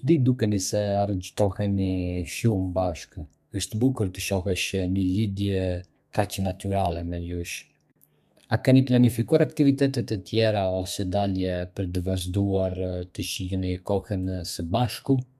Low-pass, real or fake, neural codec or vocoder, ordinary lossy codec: 14.4 kHz; fake; codec, 32 kHz, 1.9 kbps, SNAC; none